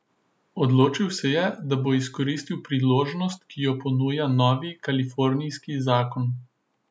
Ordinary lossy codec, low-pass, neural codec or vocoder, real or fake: none; none; none; real